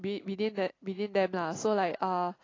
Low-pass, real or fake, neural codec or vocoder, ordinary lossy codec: 7.2 kHz; real; none; AAC, 32 kbps